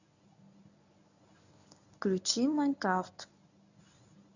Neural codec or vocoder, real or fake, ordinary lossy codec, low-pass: codec, 24 kHz, 0.9 kbps, WavTokenizer, medium speech release version 1; fake; none; 7.2 kHz